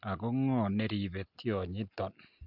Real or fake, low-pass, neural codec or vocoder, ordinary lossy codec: real; 5.4 kHz; none; none